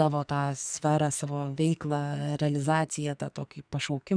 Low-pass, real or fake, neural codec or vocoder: 9.9 kHz; fake; codec, 44.1 kHz, 2.6 kbps, SNAC